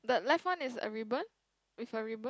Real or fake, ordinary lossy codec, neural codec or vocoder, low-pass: real; none; none; none